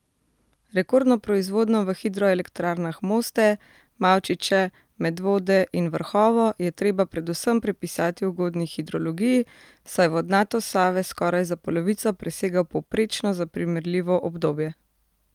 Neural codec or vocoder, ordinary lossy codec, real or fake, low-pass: none; Opus, 32 kbps; real; 19.8 kHz